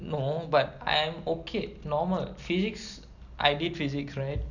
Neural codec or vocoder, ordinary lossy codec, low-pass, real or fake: none; none; 7.2 kHz; real